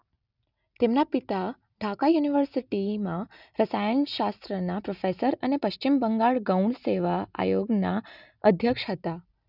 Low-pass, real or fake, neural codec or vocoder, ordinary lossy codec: 5.4 kHz; real; none; none